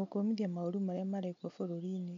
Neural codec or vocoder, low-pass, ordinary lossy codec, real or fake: none; 7.2 kHz; MP3, 48 kbps; real